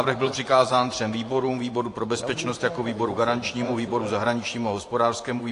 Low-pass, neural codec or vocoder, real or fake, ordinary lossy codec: 10.8 kHz; vocoder, 24 kHz, 100 mel bands, Vocos; fake; AAC, 48 kbps